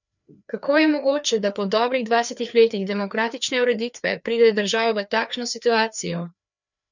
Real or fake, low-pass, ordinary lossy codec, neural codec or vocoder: fake; 7.2 kHz; none; codec, 16 kHz, 2 kbps, FreqCodec, larger model